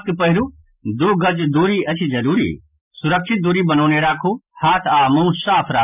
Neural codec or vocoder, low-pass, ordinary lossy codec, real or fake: none; 3.6 kHz; none; real